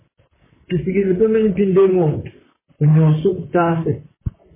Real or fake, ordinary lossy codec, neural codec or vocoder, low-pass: fake; MP3, 16 kbps; vocoder, 44.1 kHz, 128 mel bands, Pupu-Vocoder; 3.6 kHz